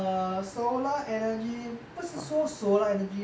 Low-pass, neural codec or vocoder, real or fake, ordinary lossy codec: none; none; real; none